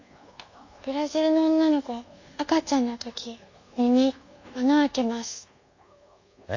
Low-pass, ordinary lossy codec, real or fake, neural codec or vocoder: 7.2 kHz; AAC, 48 kbps; fake; codec, 24 kHz, 1.2 kbps, DualCodec